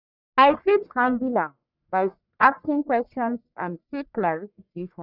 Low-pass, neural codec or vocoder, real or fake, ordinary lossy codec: 5.4 kHz; codec, 44.1 kHz, 1.7 kbps, Pupu-Codec; fake; none